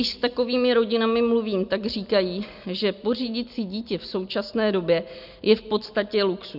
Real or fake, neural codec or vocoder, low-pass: real; none; 5.4 kHz